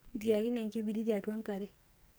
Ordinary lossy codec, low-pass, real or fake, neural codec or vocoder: none; none; fake; codec, 44.1 kHz, 2.6 kbps, SNAC